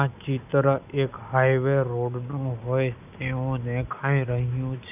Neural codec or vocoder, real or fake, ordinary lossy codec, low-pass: none; real; none; 3.6 kHz